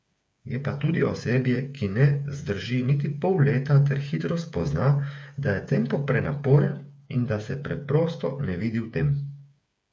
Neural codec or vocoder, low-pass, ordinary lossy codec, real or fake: codec, 16 kHz, 8 kbps, FreqCodec, smaller model; none; none; fake